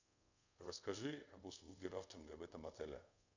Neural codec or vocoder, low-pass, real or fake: codec, 24 kHz, 0.5 kbps, DualCodec; 7.2 kHz; fake